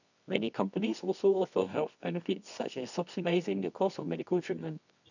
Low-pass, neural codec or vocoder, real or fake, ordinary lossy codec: 7.2 kHz; codec, 24 kHz, 0.9 kbps, WavTokenizer, medium music audio release; fake; none